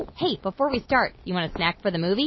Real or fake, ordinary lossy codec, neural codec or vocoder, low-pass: real; MP3, 24 kbps; none; 7.2 kHz